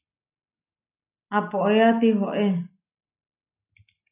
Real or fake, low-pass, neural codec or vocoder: real; 3.6 kHz; none